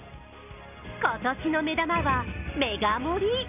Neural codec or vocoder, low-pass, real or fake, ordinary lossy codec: none; 3.6 kHz; real; none